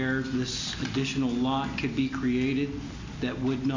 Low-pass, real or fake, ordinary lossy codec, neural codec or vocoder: 7.2 kHz; real; AAC, 48 kbps; none